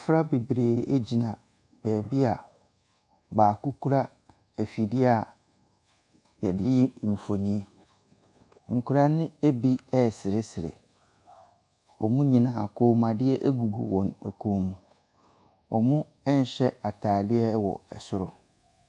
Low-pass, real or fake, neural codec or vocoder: 10.8 kHz; fake; codec, 24 kHz, 1.2 kbps, DualCodec